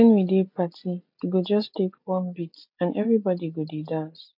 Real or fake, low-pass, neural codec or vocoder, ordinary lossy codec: real; 5.4 kHz; none; MP3, 48 kbps